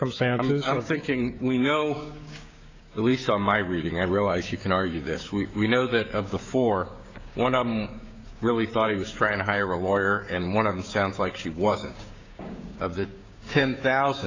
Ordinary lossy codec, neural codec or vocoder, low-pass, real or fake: AAC, 48 kbps; codec, 16 kHz, 6 kbps, DAC; 7.2 kHz; fake